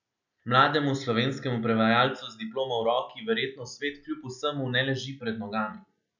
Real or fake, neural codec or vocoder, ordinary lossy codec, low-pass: real; none; none; 7.2 kHz